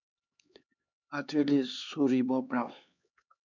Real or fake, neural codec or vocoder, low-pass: fake; codec, 16 kHz, 4 kbps, X-Codec, HuBERT features, trained on LibriSpeech; 7.2 kHz